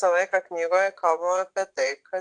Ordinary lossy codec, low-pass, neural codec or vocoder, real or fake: AAC, 64 kbps; 9.9 kHz; vocoder, 22.05 kHz, 80 mel bands, Vocos; fake